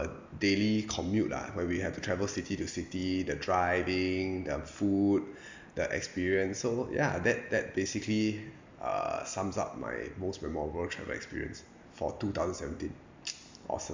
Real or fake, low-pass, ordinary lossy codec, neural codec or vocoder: real; 7.2 kHz; MP3, 64 kbps; none